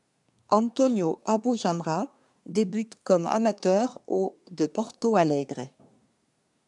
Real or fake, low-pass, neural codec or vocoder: fake; 10.8 kHz; codec, 24 kHz, 1 kbps, SNAC